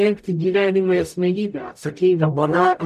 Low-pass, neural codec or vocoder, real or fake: 14.4 kHz; codec, 44.1 kHz, 0.9 kbps, DAC; fake